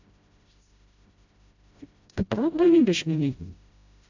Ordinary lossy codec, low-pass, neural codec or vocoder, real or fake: AAC, 48 kbps; 7.2 kHz; codec, 16 kHz, 0.5 kbps, FreqCodec, smaller model; fake